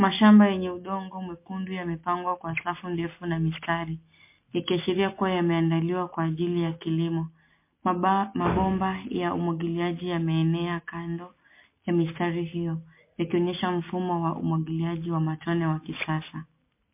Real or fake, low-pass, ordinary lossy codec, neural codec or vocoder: real; 3.6 kHz; MP3, 24 kbps; none